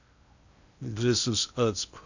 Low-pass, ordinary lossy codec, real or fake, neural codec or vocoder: 7.2 kHz; none; fake; codec, 16 kHz in and 24 kHz out, 0.8 kbps, FocalCodec, streaming, 65536 codes